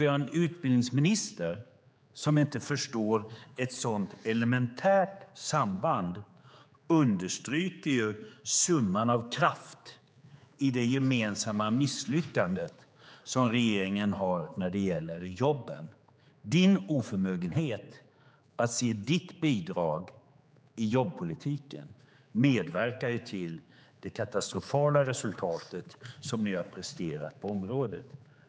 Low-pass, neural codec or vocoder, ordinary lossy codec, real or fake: none; codec, 16 kHz, 4 kbps, X-Codec, HuBERT features, trained on general audio; none; fake